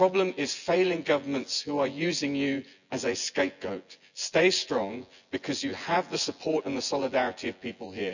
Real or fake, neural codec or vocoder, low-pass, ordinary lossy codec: fake; vocoder, 24 kHz, 100 mel bands, Vocos; 7.2 kHz; none